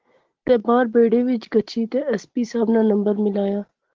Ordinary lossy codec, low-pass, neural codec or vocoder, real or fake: Opus, 16 kbps; 7.2 kHz; none; real